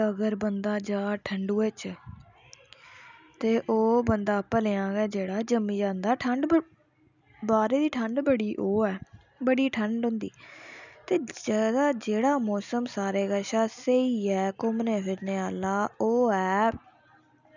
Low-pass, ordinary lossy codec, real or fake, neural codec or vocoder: 7.2 kHz; none; real; none